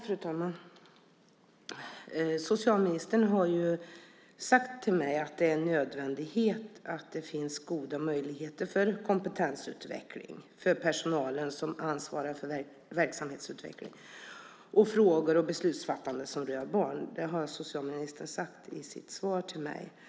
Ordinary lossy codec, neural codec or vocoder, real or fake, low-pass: none; none; real; none